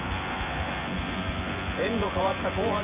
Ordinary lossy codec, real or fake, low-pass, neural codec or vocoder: Opus, 64 kbps; fake; 3.6 kHz; vocoder, 24 kHz, 100 mel bands, Vocos